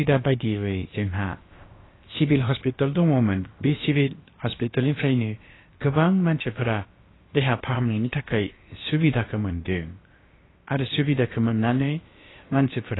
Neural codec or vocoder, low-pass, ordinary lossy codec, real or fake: codec, 16 kHz, about 1 kbps, DyCAST, with the encoder's durations; 7.2 kHz; AAC, 16 kbps; fake